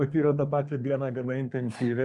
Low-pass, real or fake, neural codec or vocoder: 10.8 kHz; fake; codec, 24 kHz, 1 kbps, SNAC